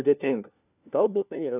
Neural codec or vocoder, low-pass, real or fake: codec, 16 kHz, 1 kbps, FunCodec, trained on LibriTTS, 50 frames a second; 3.6 kHz; fake